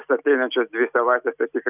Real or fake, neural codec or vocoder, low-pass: real; none; 3.6 kHz